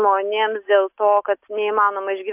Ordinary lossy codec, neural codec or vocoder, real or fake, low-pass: AAC, 32 kbps; none; real; 3.6 kHz